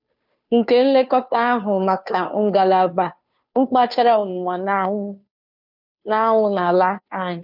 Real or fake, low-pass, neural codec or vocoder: fake; 5.4 kHz; codec, 16 kHz, 2 kbps, FunCodec, trained on Chinese and English, 25 frames a second